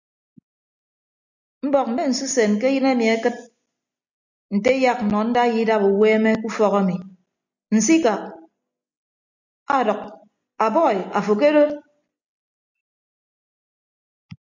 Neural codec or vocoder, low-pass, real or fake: none; 7.2 kHz; real